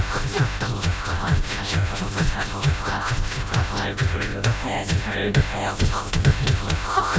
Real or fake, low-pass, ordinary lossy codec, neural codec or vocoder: fake; none; none; codec, 16 kHz, 0.5 kbps, FreqCodec, smaller model